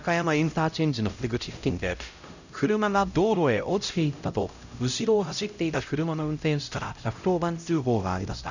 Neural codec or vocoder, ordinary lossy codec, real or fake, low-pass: codec, 16 kHz, 0.5 kbps, X-Codec, HuBERT features, trained on LibriSpeech; none; fake; 7.2 kHz